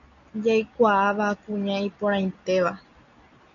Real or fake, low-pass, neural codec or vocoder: real; 7.2 kHz; none